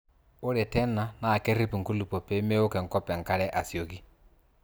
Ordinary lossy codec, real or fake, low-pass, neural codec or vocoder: none; real; none; none